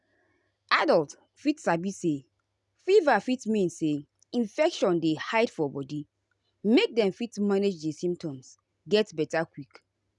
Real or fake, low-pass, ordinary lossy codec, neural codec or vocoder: real; 10.8 kHz; none; none